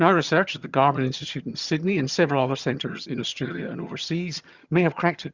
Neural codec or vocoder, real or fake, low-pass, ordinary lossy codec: vocoder, 22.05 kHz, 80 mel bands, HiFi-GAN; fake; 7.2 kHz; Opus, 64 kbps